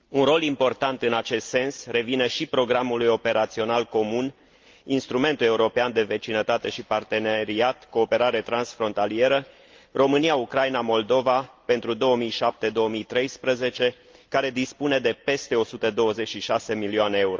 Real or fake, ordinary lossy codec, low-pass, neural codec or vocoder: real; Opus, 32 kbps; 7.2 kHz; none